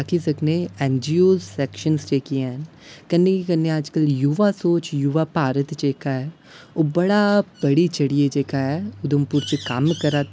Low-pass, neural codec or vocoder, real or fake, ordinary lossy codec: none; none; real; none